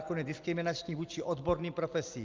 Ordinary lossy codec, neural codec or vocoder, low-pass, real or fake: Opus, 24 kbps; none; 7.2 kHz; real